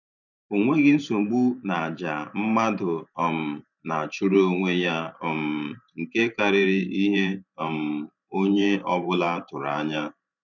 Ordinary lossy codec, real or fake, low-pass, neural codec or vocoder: none; fake; 7.2 kHz; vocoder, 44.1 kHz, 128 mel bands every 512 samples, BigVGAN v2